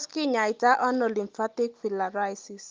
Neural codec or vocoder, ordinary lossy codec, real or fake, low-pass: none; Opus, 24 kbps; real; 7.2 kHz